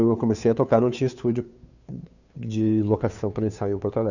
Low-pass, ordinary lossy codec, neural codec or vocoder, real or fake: 7.2 kHz; none; codec, 16 kHz, 2 kbps, FunCodec, trained on Chinese and English, 25 frames a second; fake